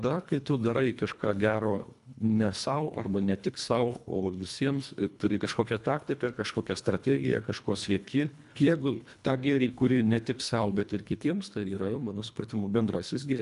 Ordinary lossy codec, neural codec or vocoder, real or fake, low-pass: MP3, 96 kbps; codec, 24 kHz, 1.5 kbps, HILCodec; fake; 10.8 kHz